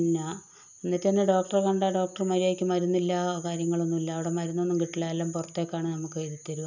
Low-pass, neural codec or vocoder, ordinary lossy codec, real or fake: 7.2 kHz; none; none; real